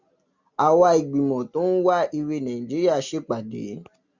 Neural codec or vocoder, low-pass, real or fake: none; 7.2 kHz; real